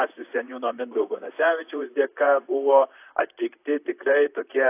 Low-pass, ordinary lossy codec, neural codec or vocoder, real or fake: 3.6 kHz; AAC, 24 kbps; vocoder, 44.1 kHz, 128 mel bands, Pupu-Vocoder; fake